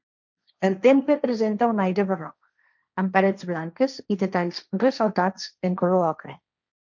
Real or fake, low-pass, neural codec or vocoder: fake; 7.2 kHz; codec, 16 kHz, 1.1 kbps, Voila-Tokenizer